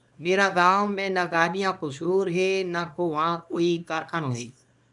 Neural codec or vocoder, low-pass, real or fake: codec, 24 kHz, 0.9 kbps, WavTokenizer, small release; 10.8 kHz; fake